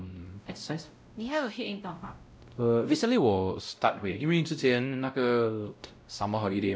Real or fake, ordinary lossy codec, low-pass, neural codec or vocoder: fake; none; none; codec, 16 kHz, 0.5 kbps, X-Codec, WavLM features, trained on Multilingual LibriSpeech